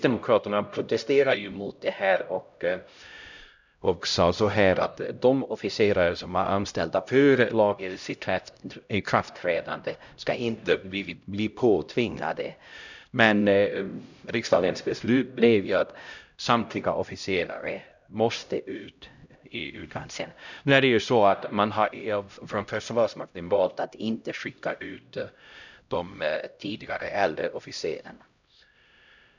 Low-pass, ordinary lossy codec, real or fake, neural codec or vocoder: 7.2 kHz; none; fake; codec, 16 kHz, 0.5 kbps, X-Codec, HuBERT features, trained on LibriSpeech